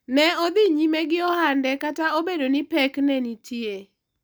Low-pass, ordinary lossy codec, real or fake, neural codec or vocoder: none; none; real; none